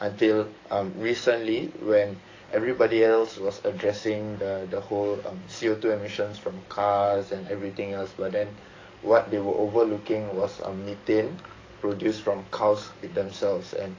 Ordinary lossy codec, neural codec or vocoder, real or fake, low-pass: AAC, 32 kbps; codec, 44.1 kHz, 7.8 kbps, DAC; fake; 7.2 kHz